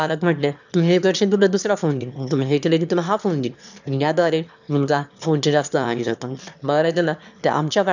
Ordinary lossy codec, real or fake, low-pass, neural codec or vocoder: none; fake; 7.2 kHz; autoencoder, 22.05 kHz, a latent of 192 numbers a frame, VITS, trained on one speaker